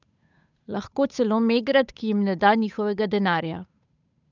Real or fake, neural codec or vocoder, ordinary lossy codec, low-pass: fake; codec, 16 kHz, 8 kbps, FunCodec, trained on Chinese and English, 25 frames a second; none; 7.2 kHz